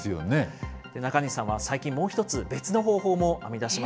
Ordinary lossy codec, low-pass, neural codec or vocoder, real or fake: none; none; none; real